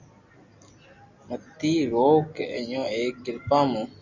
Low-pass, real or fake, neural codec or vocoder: 7.2 kHz; real; none